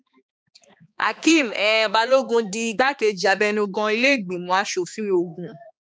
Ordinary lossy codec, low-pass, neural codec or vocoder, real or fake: none; none; codec, 16 kHz, 2 kbps, X-Codec, HuBERT features, trained on balanced general audio; fake